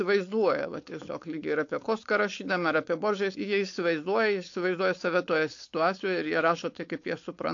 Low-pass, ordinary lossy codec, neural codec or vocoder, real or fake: 7.2 kHz; AAC, 48 kbps; codec, 16 kHz, 4.8 kbps, FACodec; fake